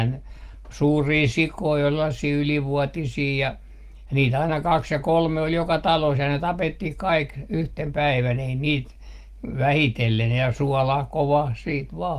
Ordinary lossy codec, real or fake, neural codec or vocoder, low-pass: Opus, 24 kbps; real; none; 14.4 kHz